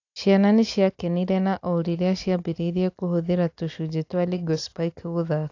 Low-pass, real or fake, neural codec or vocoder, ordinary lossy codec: 7.2 kHz; real; none; AAC, 48 kbps